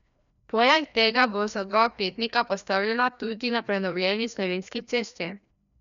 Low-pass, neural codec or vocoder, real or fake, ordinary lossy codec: 7.2 kHz; codec, 16 kHz, 1 kbps, FreqCodec, larger model; fake; none